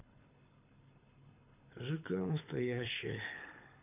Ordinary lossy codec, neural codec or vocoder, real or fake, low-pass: none; codec, 24 kHz, 6 kbps, HILCodec; fake; 3.6 kHz